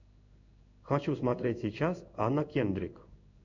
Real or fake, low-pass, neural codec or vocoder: fake; 7.2 kHz; codec, 16 kHz in and 24 kHz out, 1 kbps, XY-Tokenizer